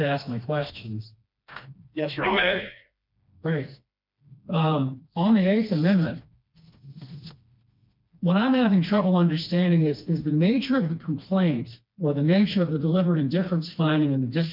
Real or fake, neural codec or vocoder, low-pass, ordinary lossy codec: fake; codec, 16 kHz, 2 kbps, FreqCodec, smaller model; 5.4 kHz; MP3, 48 kbps